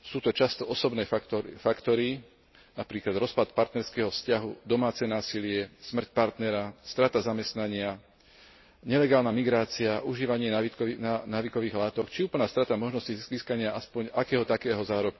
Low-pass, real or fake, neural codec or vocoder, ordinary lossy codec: 7.2 kHz; real; none; MP3, 24 kbps